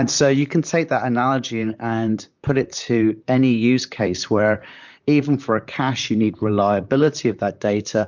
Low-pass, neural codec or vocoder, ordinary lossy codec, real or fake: 7.2 kHz; vocoder, 44.1 kHz, 128 mel bands, Pupu-Vocoder; MP3, 64 kbps; fake